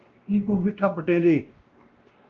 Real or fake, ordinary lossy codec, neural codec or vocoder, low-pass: fake; Opus, 24 kbps; codec, 16 kHz, 1 kbps, X-Codec, WavLM features, trained on Multilingual LibriSpeech; 7.2 kHz